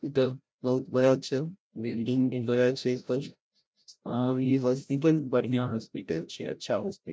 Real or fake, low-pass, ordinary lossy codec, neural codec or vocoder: fake; none; none; codec, 16 kHz, 0.5 kbps, FreqCodec, larger model